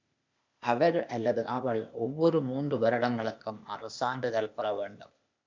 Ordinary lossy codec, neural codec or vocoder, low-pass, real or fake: MP3, 64 kbps; codec, 16 kHz, 0.8 kbps, ZipCodec; 7.2 kHz; fake